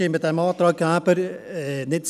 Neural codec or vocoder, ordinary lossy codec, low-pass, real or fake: none; none; 14.4 kHz; real